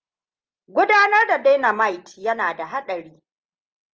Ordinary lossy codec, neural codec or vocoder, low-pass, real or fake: Opus, 24 kbps; none; 7.2 kHz; real